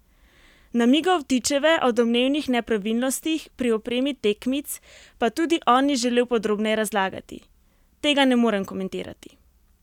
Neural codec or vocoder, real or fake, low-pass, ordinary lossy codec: none; real; 19.8 kHz; none